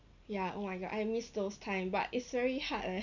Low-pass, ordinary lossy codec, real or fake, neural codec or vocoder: 7.2 kHz; none; real; none